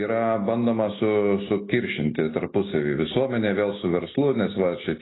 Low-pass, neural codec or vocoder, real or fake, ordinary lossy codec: 7.2 kHz; none; real; AAC, 16 kbps